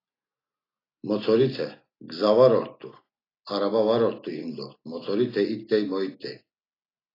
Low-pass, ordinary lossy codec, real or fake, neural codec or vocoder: 5.4 kHz; AAC, 24 kbps; real; none